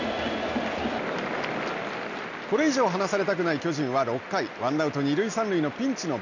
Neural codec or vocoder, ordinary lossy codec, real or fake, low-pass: none; none; real; 7.2 kHz